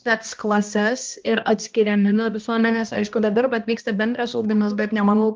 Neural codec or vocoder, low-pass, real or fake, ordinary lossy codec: codec, 16 kHz, 1 kbps, X-Codec, HuBERT features, trained on balanced general audio; 7.2 kHz; fake; Opus, 24 kbps